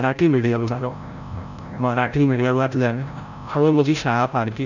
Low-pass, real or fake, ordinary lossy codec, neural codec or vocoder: 7.2 kHz; fake; none; codec, 16 kHz, 0.5 kbps, FreqCodec, larger model